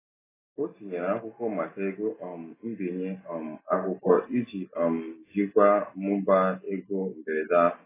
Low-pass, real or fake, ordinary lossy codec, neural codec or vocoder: 3.6 kHz; real; AAC, 16 kbps; none